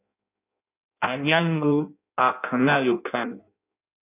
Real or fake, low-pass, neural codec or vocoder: fake; 3.6 kHz; codec, 16 kHz in and 24 kHz out, 0.6 kbps, FireRedTTS-2 codec